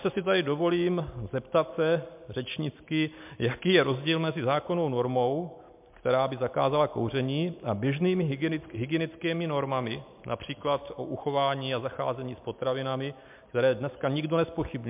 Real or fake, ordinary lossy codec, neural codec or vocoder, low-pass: real; MP3, 32 kbps; none; 3.6 kHz